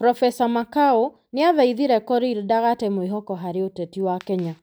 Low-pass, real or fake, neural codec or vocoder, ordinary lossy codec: none; real; none; none